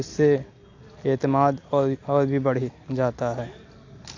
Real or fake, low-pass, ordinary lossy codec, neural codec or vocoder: real; 7.2 kHz; AAC, 48 kbps; none